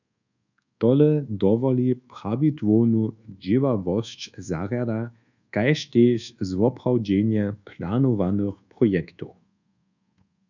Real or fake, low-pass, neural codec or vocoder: fake; 7.2 kHz; codec, 24 kHz, 1.2 kbps, DualCodec